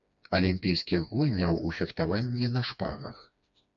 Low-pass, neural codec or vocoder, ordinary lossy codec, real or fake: 7.2 kHz; codec, 16 kHz, 2 kbps, FreqCodec, smaller model; MP3, 64 kbps; fake